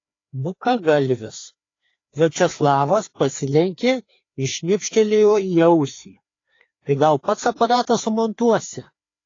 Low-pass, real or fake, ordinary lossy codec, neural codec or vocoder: 7.2 kHz; fake; AAC, 32 kbps; codec, 16 kHz, 2 kbps, FreqCodec, larger model